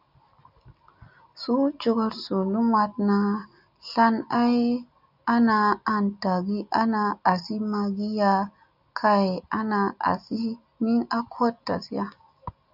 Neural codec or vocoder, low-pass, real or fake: none; 5.4 kHz; real